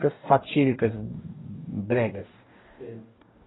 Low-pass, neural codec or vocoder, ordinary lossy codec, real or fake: 7.2 kHz; codec, 44.1 kHz, 2.6 kbps, DAC; AAC, 16 kbps; fake